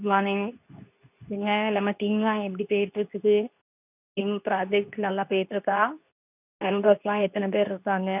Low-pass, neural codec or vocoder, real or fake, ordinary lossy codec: 3.6 kHz; codec, 24 kHz, 0.9 kbps, WavTokenizer, medium speech release version 2; fake; none